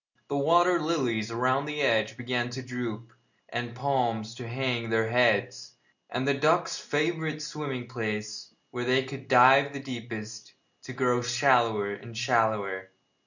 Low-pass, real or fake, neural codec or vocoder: 7.2 kHz; real; none